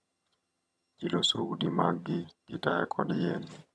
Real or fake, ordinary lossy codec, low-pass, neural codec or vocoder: fake; none; none; vocoder, 22.05 kHz, 80 mel bands, HiFi-GAN